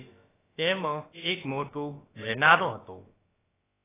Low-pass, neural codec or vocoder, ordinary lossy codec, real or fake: 3.6 kHz; codec, 16 kHz, about 1 kbps, DyCAST, with the encoder's durations; AAC, 16 kbps; fake